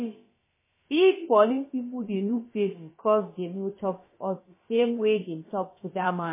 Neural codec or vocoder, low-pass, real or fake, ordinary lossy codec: codec, 16 kHz, about 1 kbps, DyCAST, with the encoder's durations; 3.6 kHz; fake; MP3, 16 kbps